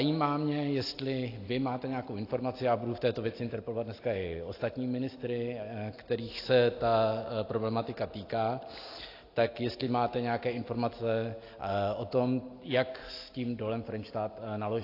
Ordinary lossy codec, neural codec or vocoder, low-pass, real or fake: AAC, 32 kbps; none; 5.4 kHz; real